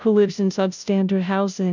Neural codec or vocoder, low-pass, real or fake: codec, 16 kHz, 0.5 kbps, FunCodec, trained on Chinese and English, 25 frames a second; 7.2 kHz; fake